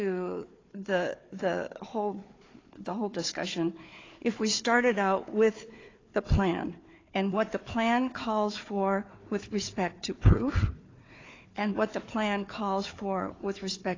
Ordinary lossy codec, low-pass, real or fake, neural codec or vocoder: AAC, 32 kbps; 7.2 kHz; fake; codec, 16 kHz, 4 kbps, FunCodec, trained on Chinese and English, 50 frames a second